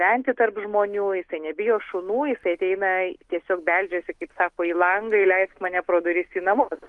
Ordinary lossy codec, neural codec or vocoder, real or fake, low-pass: Opus, 32 kbps; none; real; 10.8 kHz